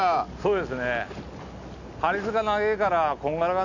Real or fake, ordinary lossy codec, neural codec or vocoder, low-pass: real; none; none; 7.2 kHz